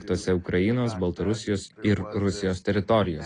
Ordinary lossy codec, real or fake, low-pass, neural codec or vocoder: AAC, 32 kbps; real; 9.9 kHz; none